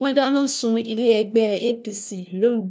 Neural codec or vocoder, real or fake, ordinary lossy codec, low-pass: codec, 16 kHz, 1 kbps, FunCodec, trained on LibriTTS, 50 frames a second; fake; none; none